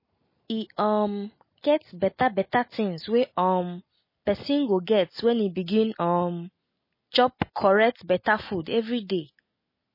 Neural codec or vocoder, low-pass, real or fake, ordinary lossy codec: none; 5.4 kHz; real; MP3, 24 kbps